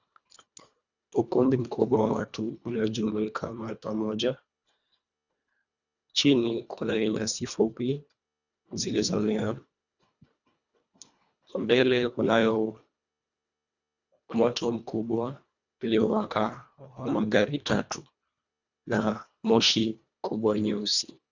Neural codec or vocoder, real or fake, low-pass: codec, 24 kHz, 1.5 kbps, HILCodec; fake; 7.2 kHz